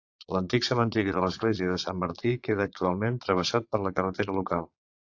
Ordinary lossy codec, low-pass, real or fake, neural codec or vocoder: AAC, 48 kbps; 7.2 kHz; fake; vocoder, 22.05 kHz, 80 mel bands, Vocos